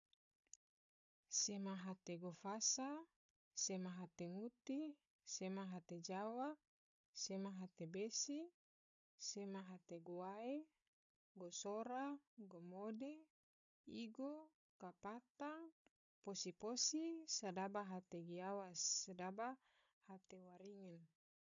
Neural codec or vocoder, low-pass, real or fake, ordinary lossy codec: codec, 16 kHz, 16 kbps, FunCodec, trained on Chinese and English, 50 frames a second; 7.2 kHz; fake; MP3, 96 kbps